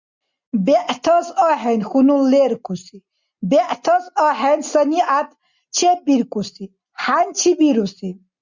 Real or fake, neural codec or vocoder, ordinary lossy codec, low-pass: real; none; Opus, 64 kbps; 7.2 kHz